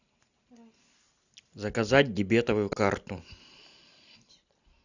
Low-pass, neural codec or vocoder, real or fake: 7.2 kHz; none; real